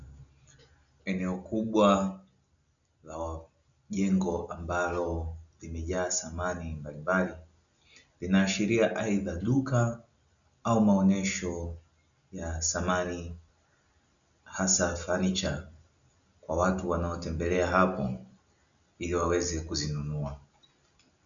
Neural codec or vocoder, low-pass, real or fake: none; 7.2 kHz; real